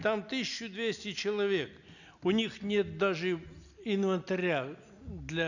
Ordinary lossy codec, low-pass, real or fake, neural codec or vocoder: none; 7.2 kHz; real; none